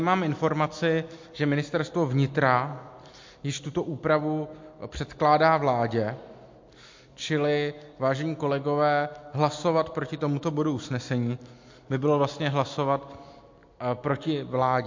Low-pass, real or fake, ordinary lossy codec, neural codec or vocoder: 7.2 kHz; real; MP3, 48 kbps; none